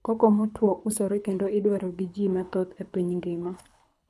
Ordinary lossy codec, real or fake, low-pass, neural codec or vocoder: none; fake; none; codec, 24 kHz, 6 kbps, HILCodec